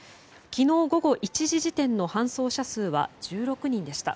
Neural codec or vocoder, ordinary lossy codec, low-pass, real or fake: none; none; none; real